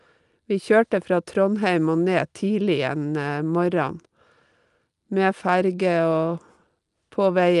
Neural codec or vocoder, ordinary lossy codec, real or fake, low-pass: none; Opus, 32 kbps; real; 10.8 kHz